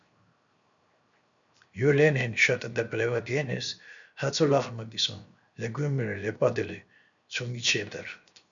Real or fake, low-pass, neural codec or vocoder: fake; 7.2 kHz; codec, 16 kHz, 0.7 kbps, FocalCodec